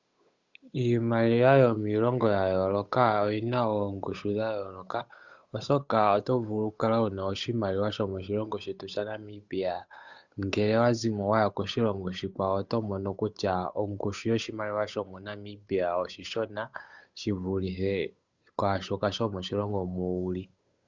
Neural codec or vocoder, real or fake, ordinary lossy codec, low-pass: codec, 16 kHz, 8 kbps, FunCodec, trained on Chinese and English, 25 frames a second; fake; Opus, 64 kbps; 7.2 kHz